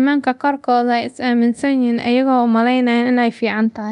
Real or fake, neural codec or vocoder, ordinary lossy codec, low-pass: fake; codec, 24 kHz, 0.9 kbps, DualCodec; none; 10.8 kHz